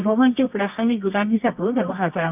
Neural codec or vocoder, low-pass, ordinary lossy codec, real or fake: codec, 24 kHz, 0.9 kbps, WavTokenizer, medium music audio release; 3.6 kHz; none; fake